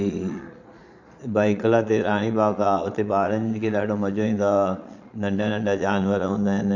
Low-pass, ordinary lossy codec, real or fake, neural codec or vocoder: 7.2 kHz; none; fake; vocoder, 22.05 kHz, 80 mel bands, Vocos